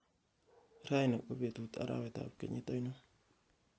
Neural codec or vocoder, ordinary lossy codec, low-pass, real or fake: none; none; none; real